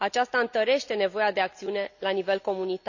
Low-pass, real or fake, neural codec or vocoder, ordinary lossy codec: 7.2 kHz; real; none; none